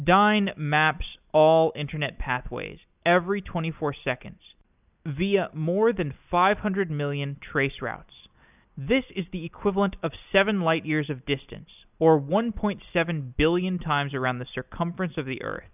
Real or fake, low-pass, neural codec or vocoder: real; 3.6 kHz; none